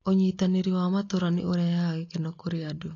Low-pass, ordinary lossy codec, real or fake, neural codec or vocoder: 7.2 kHz; AAC, 32 kbps; real; none